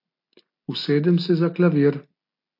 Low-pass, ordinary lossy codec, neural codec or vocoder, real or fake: 5.4 kHz; MP3, 32 kbps; none; real